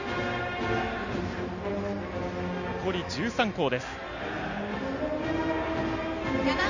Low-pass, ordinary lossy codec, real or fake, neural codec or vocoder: 7.2 kHz; none; real; none